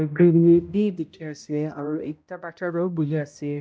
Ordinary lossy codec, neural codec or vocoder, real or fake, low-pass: none; codec, 16 kHz, 0.5 kbps, X-Codec, HuBERT features, trained on balanced general audio; fake; none